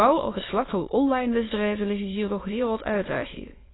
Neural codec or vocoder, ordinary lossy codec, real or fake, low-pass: autoencoder, 22.05 kHz, a latent of 192 numbers a frame, VITS, trained on many speakers; AAC, 16 kbps; fake; 7.2 kHz